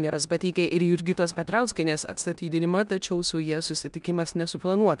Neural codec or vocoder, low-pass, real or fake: codec, 16 kHz in and 24 kHz out, 0.9 kbps, LongCat-Audio-Codec, four codebook decoder; 10.8 kHz; fake